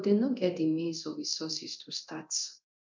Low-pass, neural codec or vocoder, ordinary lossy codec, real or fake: 7.2 kHz; codec, 24 kHz, 0.9 kbps, DualCodec; MP3, 64 kbps; fake